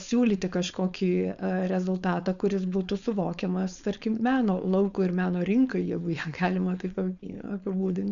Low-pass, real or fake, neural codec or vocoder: 7.2 kHz; fake; codec, 16 kHz, 4.8 kbps, FACodec